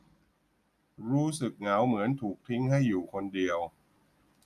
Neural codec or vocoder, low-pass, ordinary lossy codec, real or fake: none; 14.4 kHz; none; real